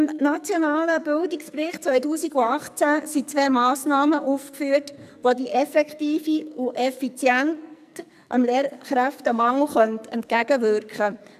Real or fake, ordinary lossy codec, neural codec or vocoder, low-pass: fake; none; codec, 44.1 kHz, 2.6 kbps, SNAC; 14.4 kHz